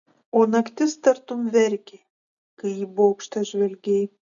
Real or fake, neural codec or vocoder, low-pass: real; none; 7.2 kHz